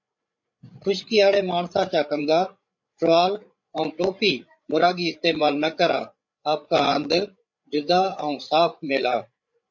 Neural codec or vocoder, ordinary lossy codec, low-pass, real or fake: codec, 16 kHz, 8 kbps, FreqCodec, larger model; MP3, 48 kbps; 7.2 kHz; fake